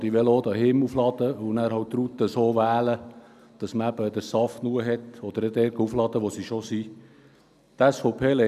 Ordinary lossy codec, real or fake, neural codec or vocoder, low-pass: none; real; none; 14.4 kHz